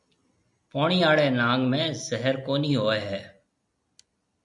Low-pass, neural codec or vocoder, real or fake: 10.8 kHz; none; real